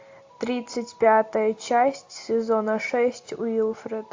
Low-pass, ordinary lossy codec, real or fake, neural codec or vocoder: 7.2 kHz; AAC, 48 kbps; real; none